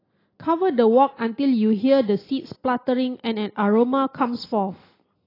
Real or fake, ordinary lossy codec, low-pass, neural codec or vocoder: real; AAC, 24 kbps; 5.4 kHz; none